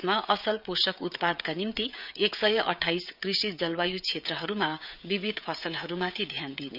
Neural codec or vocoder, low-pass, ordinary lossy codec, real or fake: codec, 16 kHz, 16 kbps, FreqCodec, smaller model; 5.4 kHz; none; fake